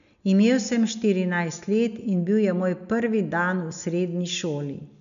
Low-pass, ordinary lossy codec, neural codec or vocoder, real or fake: 7.2 kHz; none; none; real